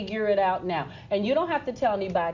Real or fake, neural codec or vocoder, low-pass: real; none; 7.2 kHz